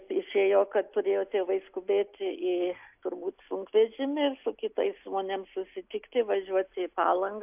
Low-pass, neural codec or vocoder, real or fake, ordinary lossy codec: 3.6 kHz; none; real; AAC, 32 kbps